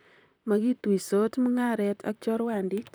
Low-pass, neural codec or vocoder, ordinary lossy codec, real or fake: none; vocoder, 44.1 kHz, 128 mel bands, Pupu-Vocoder; none; fake